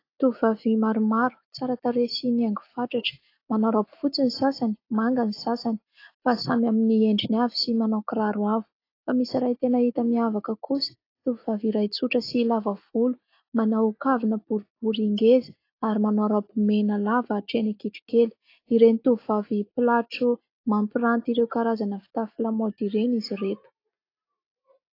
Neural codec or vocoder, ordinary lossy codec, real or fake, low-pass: none; AAC, 32 kbps; real; 5.4 kHz